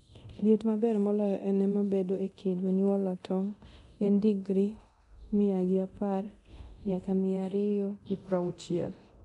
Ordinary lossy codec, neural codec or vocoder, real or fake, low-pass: MP3, 96 kbps; codec, 24 kHz, 0.9 kbps, DualCodec; fake; 10.8 kHz